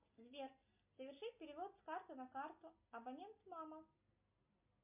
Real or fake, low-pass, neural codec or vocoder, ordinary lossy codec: real; 3.6 kHz; none; MP3, 32 kbps